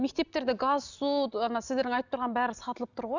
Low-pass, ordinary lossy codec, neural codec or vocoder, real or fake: 7.2 kHz; none; none; real